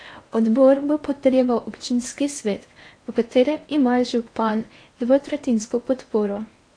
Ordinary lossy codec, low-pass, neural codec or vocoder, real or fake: AAC, 48 kbps; 9.9 kHz; codec, 16 kHz in and 24 kHz out, 0.8 kbps, FocalCodec, streaming, 65536 codes; fake